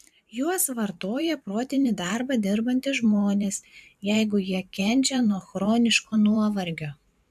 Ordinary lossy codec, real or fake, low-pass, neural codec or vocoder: MP3, 96 kbps; fake; 14.4 kHz; vocoder, 44.1 kHz, 128 mel bands every 512 samples, BigVGAN v2